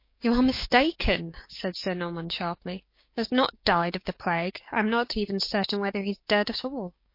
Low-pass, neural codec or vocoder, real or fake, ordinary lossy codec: 5.4 kHz; codec, 16 kHz, 6 kbps, DAC; fake; MP3, 32 kbps